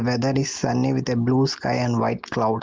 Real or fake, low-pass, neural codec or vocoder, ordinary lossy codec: real; 7.2 kHz; none; Opus, 16 kbps